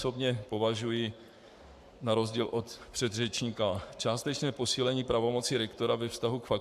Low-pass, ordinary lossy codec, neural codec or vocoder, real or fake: 14.4 kHz; AAC, 96 kbps; codec, 44.1 kHz, 7.8 kbps, Pupu-Codec; fake